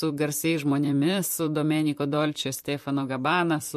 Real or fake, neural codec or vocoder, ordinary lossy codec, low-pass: fake; vocoder, 44.1 kHz, 128 mel bands, Pupu-Vocoder; MP3, 64 kbps; 14.4 kHz